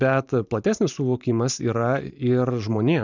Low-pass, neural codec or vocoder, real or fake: 7.2 kHz; vocoder, 44.1 kHz, 128 mel bands every 512 samples, BigVGAN v2; fake